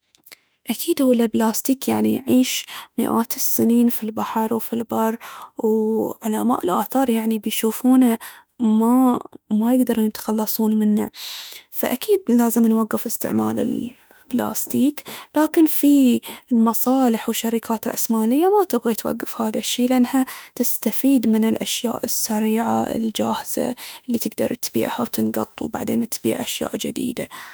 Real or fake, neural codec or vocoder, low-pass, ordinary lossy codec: fake; autoencoder, 48 kHz, 32 numbers a frame, DAC-VAE, trained on Japanese speech; none; none